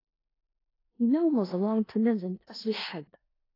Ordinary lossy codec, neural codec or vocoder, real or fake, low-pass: AAC, 24 kbps; codec, 16 kHz in and 24 kHz out, 0.4 kbps, LongCat-Audio-Codec, four codebook decoder; fake; 5.4 kHz